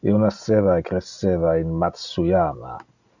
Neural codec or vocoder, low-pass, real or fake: none; 7.2 kHz; real